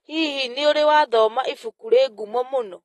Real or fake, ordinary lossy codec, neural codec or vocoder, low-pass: real; AAC, 32 kbps; none; 19.8 kHz